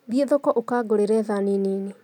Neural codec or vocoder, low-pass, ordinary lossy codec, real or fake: none; 19.8 kHz; none; real